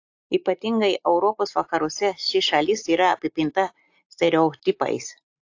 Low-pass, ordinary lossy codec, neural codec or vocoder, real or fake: 7.2 kHz; AAC, 48 kbps; none; real